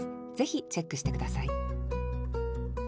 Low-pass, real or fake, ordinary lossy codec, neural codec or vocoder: none; real; none; none